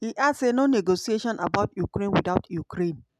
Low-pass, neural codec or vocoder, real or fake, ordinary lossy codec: 14.4 kHz; none; real; none